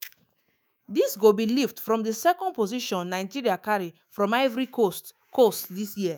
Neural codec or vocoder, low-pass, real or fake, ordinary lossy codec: autoencoder, 48 kHz, 128 numbers a frame, DAC-VAE, trained on Japanese speech; none; fake; none